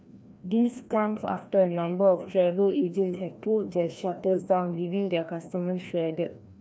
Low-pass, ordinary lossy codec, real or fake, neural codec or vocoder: none; none; fake; codec, 16 kHz, 1 kbps, FreqCodec, larger model